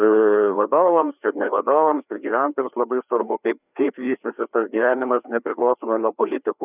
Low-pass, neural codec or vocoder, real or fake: 3.6 kHz; codec, 16 kHz, 2 kbps, FreqCodec, larger model; fake